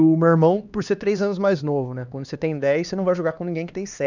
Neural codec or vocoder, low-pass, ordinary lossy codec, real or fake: codec, 16 kHz, 2 kbps, X-Codec, HuBERT features, trained on LibriSpeech; 7.2 kHz; none; fake